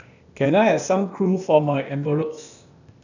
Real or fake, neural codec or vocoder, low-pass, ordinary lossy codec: fake; codec, 16 kHz, 0.8 kbps, ZipCodec; 7.2 kHz; none